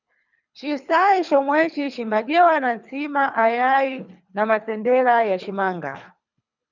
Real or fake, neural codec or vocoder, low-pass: fake; codec, 24 kHz, 3 kbps, HILCodec; 7.2 kHz